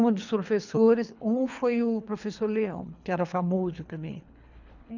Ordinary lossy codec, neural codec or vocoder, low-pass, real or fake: none; codec, 24 kHz, 3 kbps, HILCodec; 7.2 kHz; fake